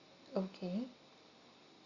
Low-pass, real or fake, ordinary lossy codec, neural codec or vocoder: 7.2 kHz; real; Opus, 64 kbps; none